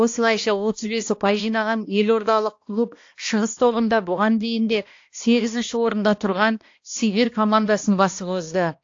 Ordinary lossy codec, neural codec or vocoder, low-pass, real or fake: AAC, 48 kbps; codec, 16 kHz, 1 kbps, X-Codec, HuBERT features, trained on balanced general audio; 7.2 kHz; fake